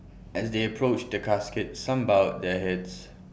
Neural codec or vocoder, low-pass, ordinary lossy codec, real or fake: none; none; none; real